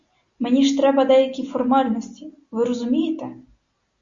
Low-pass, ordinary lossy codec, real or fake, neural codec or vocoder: 7.2 kHz; Opus, 64 kbps; real; none